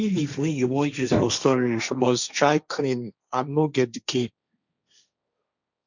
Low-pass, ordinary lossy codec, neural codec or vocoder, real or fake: 7.2 kHz; none; codec, 16 kHz, 1.1 kbps, Voila-Tokenizer; fake